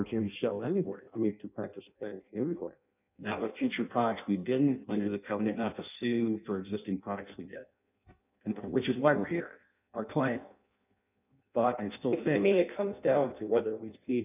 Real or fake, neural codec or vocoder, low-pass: fake; codec, 16 kHz in and 24 kHz out, 0.6 kbps, FireRedTTS-2 codec; 3.6 kHz